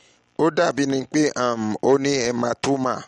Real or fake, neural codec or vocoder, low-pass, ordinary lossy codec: real; none; 19.8 kHz; MP3, 48 kbps